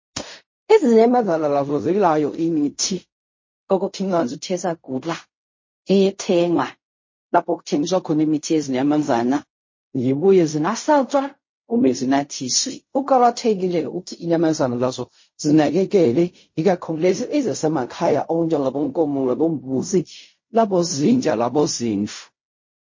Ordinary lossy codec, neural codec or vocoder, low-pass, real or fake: MP3, 32 kbps; codec, 16 kHz in and 24 kHz out, 0.4 kbps, LongCat-Audio-Codec, fine tuned four codebook decoder; 7.2 kHz; fake